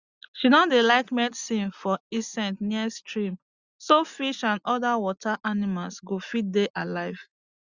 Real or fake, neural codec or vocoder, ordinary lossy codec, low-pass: real; none; Opus, 64 kbps; 7.2 kHz